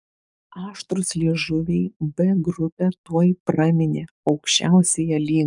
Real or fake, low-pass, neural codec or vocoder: fake; 10.8 kHz; codec, 44.1 kHz, 7.8 kbps, DAC